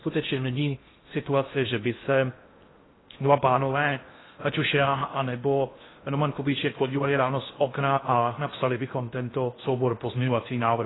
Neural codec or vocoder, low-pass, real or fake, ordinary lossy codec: codec, 16 kHz in and 24 kHz out, 0.6 kbps, FocalCodec, streaming, 4096 codes; 7.2 kHz; fake; AAC, 16 kbps